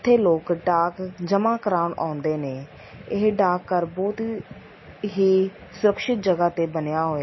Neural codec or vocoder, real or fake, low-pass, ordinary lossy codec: none; real; 7.2 kHz; MP3, 24 kbps